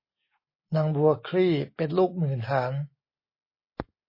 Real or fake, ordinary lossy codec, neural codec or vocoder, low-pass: real; MP3, 24 kbps; none; 5.4 kHz